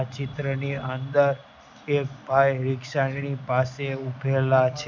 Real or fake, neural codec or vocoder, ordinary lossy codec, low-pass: real; none; none; 7.2 kHz